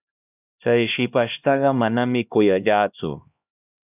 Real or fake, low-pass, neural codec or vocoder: fake; 3.6 kHz; codec, 16 kHz, 1 kbps, X-Codec, HuBERT features, trained on LibriSpeech